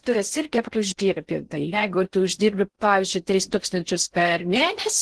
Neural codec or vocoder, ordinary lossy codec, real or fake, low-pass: codec, 16 kHz in and 24 kHz out, 0.6 kbps, FocalCodec, streaming, 4096 codes; Opus, 16 kbps; fake; 10.8 kHz